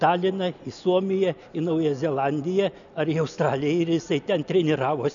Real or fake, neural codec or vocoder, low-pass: real; none; 7.2 kHz